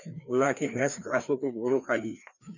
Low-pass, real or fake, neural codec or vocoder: 7.2 kHz; fake; codec, 16 kHz, 2 kbps, FreqCodec, larger model